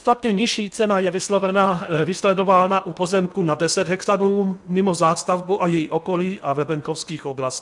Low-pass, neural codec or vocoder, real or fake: 10.8 kHz; codec, 16 kHz in and 24 kHz out, 0.8 kbps, FocalCodec, streaming, 65536 codes; fake